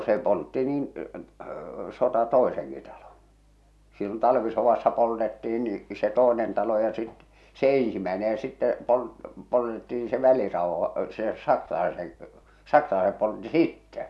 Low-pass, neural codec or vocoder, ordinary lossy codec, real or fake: none; none; none; real